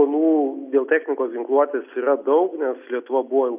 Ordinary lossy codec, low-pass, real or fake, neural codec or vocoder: AAC, 32 kbps; 3.6 kHz; real; none